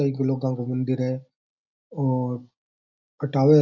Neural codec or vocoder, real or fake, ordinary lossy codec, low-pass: none; real; none; 7.2 kHz